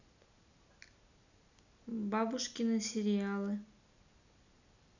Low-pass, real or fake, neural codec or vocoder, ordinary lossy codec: 7.2 kHz; real; none; none